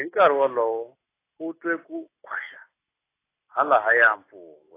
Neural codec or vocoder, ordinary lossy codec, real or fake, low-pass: none; AAC, 24 kbps; real; 3.6 kHz